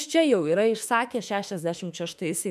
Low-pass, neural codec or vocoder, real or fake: 14.4 kHz; autoencoder, 48 kHz, 32 numbers a frame, DAC-VAE, trained on Japanese speech; fake